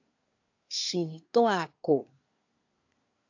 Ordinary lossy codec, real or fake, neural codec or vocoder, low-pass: AAC, 48 kbps; fake; codec, 24 kHz, 1 kbps, SNAC; 7.2 kHz